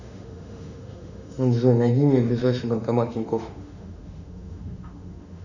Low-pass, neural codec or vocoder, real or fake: 7.2 kHz; autoencoder, 48 kHz, 32 numbers a frame, DAC-VAE, trained on Japanese speech; fake